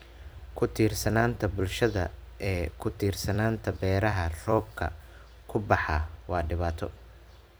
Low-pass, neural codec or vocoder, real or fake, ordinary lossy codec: none; vocoder, 44.1 kHz, 128 mel bands every 256 samples, BigVGAN v2; fake; none